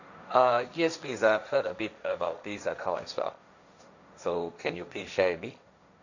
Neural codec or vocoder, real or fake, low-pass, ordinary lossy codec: codec, 16 kHz, 1.1 kbps, Voila-Tokenizer; fake; 7.2 kHz; none